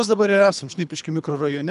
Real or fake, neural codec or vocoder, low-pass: fake; codec, 24 kHz, 3 kbps, HILCodec; 10.8 kHz